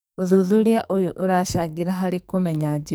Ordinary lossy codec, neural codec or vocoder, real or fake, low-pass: none; codec, 44.1 kHz, 2.6 kbps, SNAC; fake; none